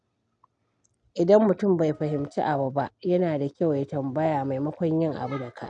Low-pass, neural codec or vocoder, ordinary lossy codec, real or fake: 9.9 kHz; none; none; real